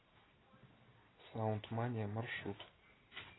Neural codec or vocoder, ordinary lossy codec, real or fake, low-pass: none; AAC, 16 kbps; real; 7.2 kHz